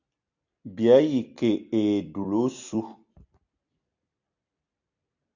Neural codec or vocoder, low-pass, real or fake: vocoder, 44.1 kHz, 128 mel bands every 256 samples, BigVGAN v2; 7.2 kHz; fake